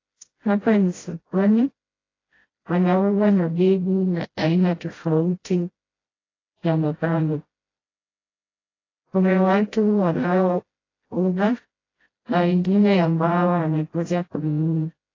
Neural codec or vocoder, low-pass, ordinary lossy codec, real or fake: codec, 16 kHz, 0.5 kbps, FreqCodec, smaller model; 7.2 kHz; AAC, 32 kbps; fake